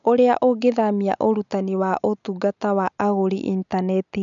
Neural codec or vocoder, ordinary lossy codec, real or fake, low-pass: none; none; real; 7.2 kHz